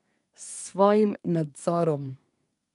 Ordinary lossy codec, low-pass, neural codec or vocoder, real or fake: none; 10.8 kHz; codec, 24 kHz, 1 kbps, SNAC; fake